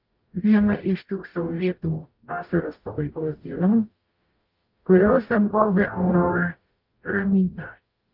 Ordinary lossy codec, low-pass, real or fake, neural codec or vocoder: Opus, 24 kbps; 5.4 kHz; fake; codec, 44.1 kHz, 0.9 kbps, DAC